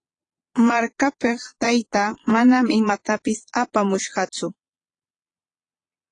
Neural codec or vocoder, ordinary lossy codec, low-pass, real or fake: vocoder, 22.05 kHz, 80 mel bands, Vocos; AAC, 32 kbps; 9.9 kHz; fake